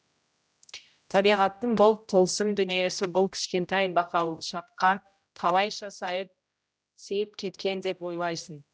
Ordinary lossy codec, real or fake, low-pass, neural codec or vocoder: none; fake; none; codec, 16 kHz, 0.5 kbps, X-Codec, HuBERT features, trained on general audio